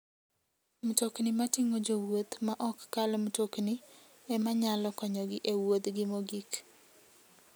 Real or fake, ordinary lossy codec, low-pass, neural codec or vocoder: real; none; none; none